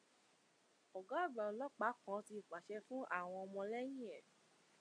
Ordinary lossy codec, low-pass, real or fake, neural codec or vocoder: MP3, 48 kbps; 9.9 kHz; real; none